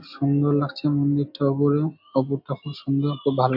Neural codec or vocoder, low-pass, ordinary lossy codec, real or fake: none; 5.4 kHz; AAC, 48 kbps; real